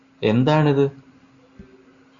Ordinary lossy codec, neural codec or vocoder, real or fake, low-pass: Opus, 64 kbps; none; real; 7.2 kHz